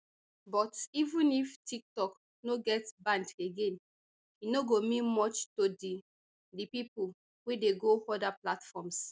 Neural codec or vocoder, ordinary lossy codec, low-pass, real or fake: none; none; none; real